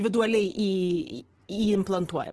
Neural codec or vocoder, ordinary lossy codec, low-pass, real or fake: vocoder, 24 kHz, 100 mel bands, Vocos; Opus, 16 kbps; 10.8 kHz; fake